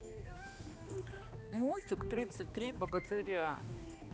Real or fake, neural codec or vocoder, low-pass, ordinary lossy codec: fake; codec, 16 kHz, 2 kbps, X-Codec, HuBERT features, trained on balanced general audio; none; none